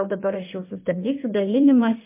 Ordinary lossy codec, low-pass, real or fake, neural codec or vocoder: MP3, 24 kbps; 3.6 kHz; fake; codec, 16 kHz in and 24 kHz out, 1.1 kbps, FireRedTTS-2 codec